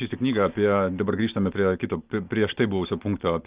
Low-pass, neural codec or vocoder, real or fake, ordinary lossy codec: 3.6 kHz; none; real; Opus, 24 kbps